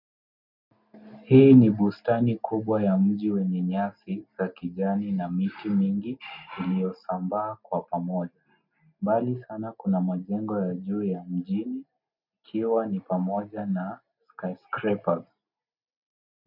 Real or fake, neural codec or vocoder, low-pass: real; none; 5.4 kHz